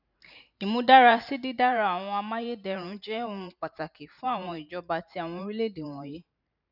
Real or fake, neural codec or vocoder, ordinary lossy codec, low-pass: fake; vocoder, 44.1 kHz, 128 mel bands every 512 samples, BigVGAN v2; none; 5.4 kHz